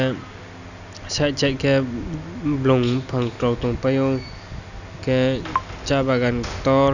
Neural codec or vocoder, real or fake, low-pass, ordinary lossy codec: none; real; 7.2 kHz; none